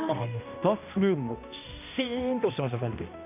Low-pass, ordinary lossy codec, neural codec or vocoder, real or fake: 3.6 kHz; MP3, 32 kbps; codec, 16 kHz, 1 kbps, X-Codec, HuBERT features, trained on balanced general audio; fake